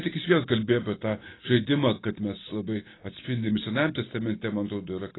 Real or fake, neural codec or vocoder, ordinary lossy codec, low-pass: real; none; AAC, 16 kbps; 7.2 kHz